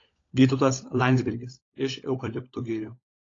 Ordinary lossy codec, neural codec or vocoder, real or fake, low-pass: AAC, 32 kbps; codec, 16 kHz, 16 kbps, FunCodec, trained on LibriTTS, 50 frames a second; fake; 7.2 kHz